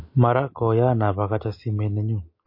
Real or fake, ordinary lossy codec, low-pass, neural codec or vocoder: real; MP3, 32 kbps; 5.4 kHz; none